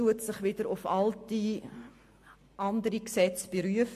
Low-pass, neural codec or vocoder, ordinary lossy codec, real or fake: 14.4 kHz; none; MP3, 64 kbps; real